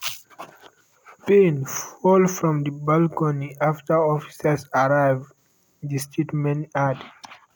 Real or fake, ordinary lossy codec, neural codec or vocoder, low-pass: real; none; none; none